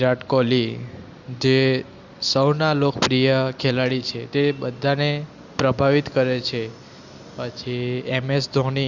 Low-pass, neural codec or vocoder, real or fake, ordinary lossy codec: 7.2 kHz; none; real; Opus, 64 kbps